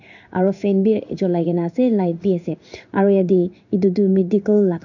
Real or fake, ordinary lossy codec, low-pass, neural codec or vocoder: fake; none; 7.2 kHz; codec, 16 kHz in and 24 kHz out, 1 kbps, XY-Tokenizer